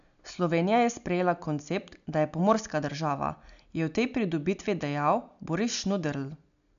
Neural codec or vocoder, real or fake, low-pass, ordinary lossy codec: none; real; 7.2 kHz; none